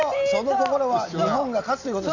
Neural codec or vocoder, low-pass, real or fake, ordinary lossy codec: none; 7.2 kHz; real; none